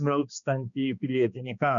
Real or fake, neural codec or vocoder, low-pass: fake; codec, 16 kHz, 2 kbps, X-Codec, HuBERT features, trained on balanced general audio; 7.2 kHz